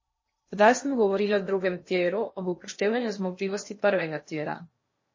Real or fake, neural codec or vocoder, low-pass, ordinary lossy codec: fake; codec, 16 kHz in and 24 kHz out, 0.8 kbps, FocalCodec, streaming, 65536 codes; 7.2 kHz; MP3, 32 kbps